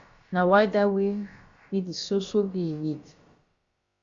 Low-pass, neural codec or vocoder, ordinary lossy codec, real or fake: 7.2 kHz; codec, 16 kHz, about 1 kbps, DyCAST, with the encoder's durations; Opus, 64 kbps; fake